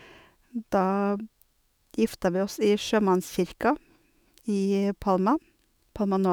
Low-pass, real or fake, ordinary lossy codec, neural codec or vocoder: none; fake; none; autoencoder, 48 kHz, 128 numbers a frame, DAC-VAE, trained on Japanese speech